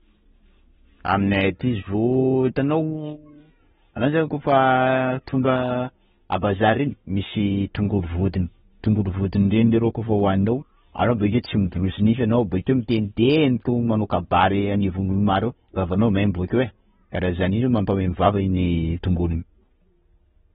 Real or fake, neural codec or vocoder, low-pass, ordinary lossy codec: real; none; 10.8 kHz; AAC, 16 kbps